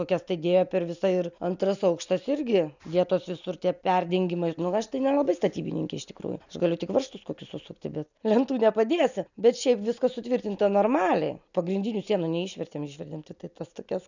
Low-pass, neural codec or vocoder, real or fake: 7.2 kHz; none; real